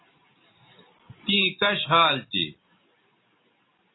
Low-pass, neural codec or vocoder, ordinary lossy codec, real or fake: 7.2 kHz; none; AAC, 16 kbps; real